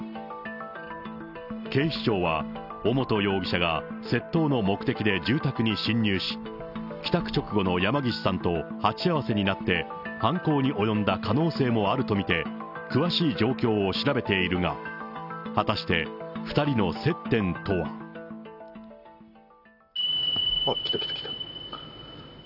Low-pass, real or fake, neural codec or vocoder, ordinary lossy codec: 5.4 kHz; real; none; none